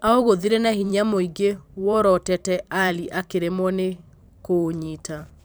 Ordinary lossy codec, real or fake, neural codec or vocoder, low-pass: none; fake; vocoder, 44.1 kHz, 128 mel bands every 512 samples, BigVGAN v2; none